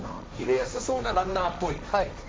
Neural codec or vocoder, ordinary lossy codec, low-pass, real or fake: codec, 16 kHz, 1.1 kbps, Voila-Tokenizer; none; none; fake